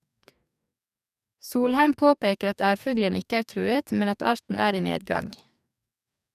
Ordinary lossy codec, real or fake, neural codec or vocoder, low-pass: none; fake; codec, 44.1 kHz, 2.6 kbps, DAC; 14.4 kHz